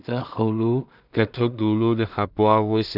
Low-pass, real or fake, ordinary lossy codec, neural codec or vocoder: 5.4 kHz; fake; none; codec, 16 kHz in and 24 kHz out, 0.4 kbps, LongCat-Audio-Codec, two codebook decoder